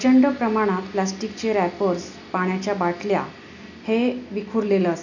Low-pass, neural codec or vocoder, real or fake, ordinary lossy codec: 7.2 kHz; none; real; none